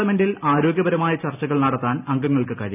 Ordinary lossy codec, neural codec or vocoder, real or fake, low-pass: none; none; real; 3.6 kHz